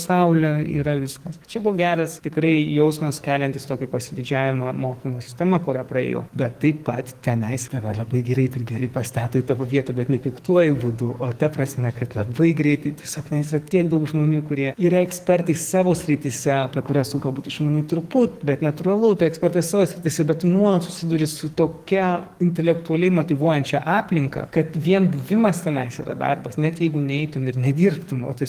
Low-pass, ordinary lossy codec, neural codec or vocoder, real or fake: 14.4 kHz; Opus, 24 kbps; codec, 44.1 kHz, 2.6 kbps, SNAC; fake